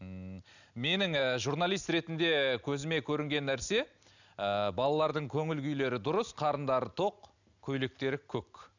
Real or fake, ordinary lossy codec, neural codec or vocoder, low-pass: real; none; none; 7.2 kHz